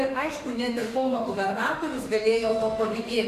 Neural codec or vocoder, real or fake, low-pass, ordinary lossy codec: autoencoder, 48 kHz, 32 numbers a frame, DAC-VAE, trained on Japanese speech; fake; 14.4 kHz; AAC, 96 kbps